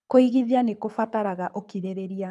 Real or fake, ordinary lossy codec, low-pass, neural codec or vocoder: fake; none; none; codec, 24 kHz, 6 kbps, HILCodec